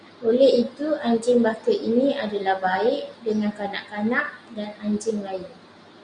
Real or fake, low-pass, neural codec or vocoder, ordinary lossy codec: real; 9.9 kHz; none; Opus, 64 kbps